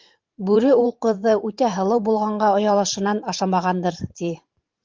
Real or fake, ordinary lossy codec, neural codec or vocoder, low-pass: fake; Opus, 24 kbps; vocoder, 44.1 kHz, 128 mel bands every 512 samples, BigVGAN v2; 7.2 kHz